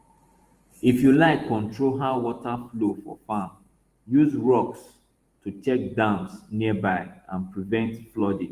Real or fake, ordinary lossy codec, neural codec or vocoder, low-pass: fake; Opus, 24 kbps; vocoder, 44.1 kHz, 128 mel bands every 512 samples, BigVGAN v2; 14.4 kHz